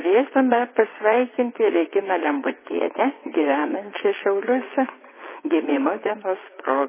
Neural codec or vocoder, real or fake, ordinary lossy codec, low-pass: vocoder, 22.05 kHz, 80 mel bands, WaveNeXt; fake; MP3, 16 kbps; 3.6 kHz